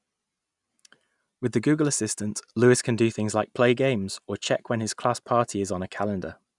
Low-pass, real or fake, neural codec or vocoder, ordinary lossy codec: 10.8 kHz; real; none; none